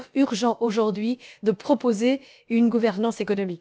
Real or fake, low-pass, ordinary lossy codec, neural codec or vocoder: fake; none; none; codec, 16 kHz, about 1 kbps, DyCAST, with the encoder's durations